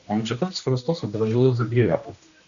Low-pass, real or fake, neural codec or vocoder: 7.2 kHz; fake; codec, 16 kHz, 1 kbps, X-Codec, HuBERT features, trained on general audio